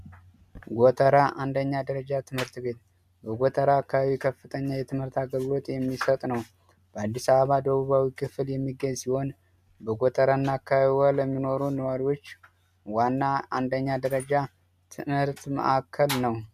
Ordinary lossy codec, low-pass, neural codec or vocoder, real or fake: MP3, 96 kbps; 14.4 kHz; none; real